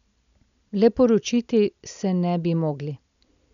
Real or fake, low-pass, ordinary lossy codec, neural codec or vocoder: real; 7.2 kHz; none; none